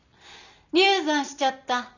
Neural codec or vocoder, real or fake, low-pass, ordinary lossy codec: none; real; 7.2 kHz; none